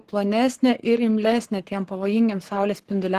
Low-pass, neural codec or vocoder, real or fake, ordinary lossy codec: 14.4 kHz; codec, 44.1 kHz, 7.8 kbps, Pupu-Codec; fake; Opus, 16 kbps